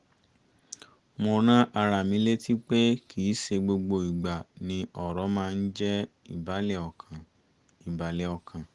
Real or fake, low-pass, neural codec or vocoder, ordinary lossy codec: real; 10.8 kHz; none; Opus, 24 kbps